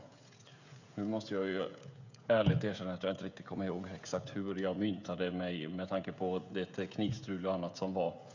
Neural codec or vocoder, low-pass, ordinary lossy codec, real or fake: codec, 16 kHz, 16 kbps, FreqCodec, smaller model; 7.2 kHz; none; fake